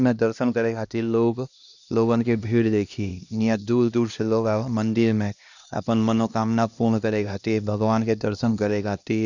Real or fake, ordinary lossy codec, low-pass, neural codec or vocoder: fake; none; 7.2 kHz; codec, 16 kHz, 1 kbps, X-Codec, HuBERT features, trained on LibriSpeech